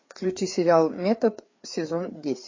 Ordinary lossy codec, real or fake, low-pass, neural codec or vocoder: MP3, 32 kbps; fake; 7.2 kHz; codec, 16 kHz, 4 kbps, FreqCodec, larger model